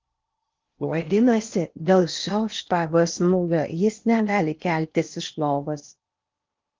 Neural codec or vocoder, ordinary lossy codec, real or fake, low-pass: codec, 16 kHz in and 24 kHz out, 0.6 kbps, FocalCodec, streaming, 4096 codes; Opus, 24 kbps; fake; 7.2 kHz